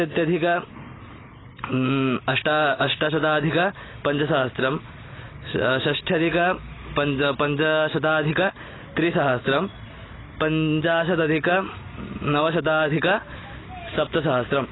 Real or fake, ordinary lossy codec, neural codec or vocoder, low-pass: real; AAC, 16 kbps; none; 7.2 kHz